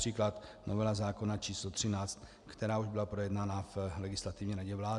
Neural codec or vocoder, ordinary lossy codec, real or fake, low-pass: none; Opus, 64 kbps; real; 10.8 kHz